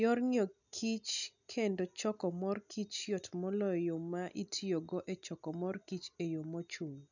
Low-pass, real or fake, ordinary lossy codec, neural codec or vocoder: 7.2 kHz; real; none; none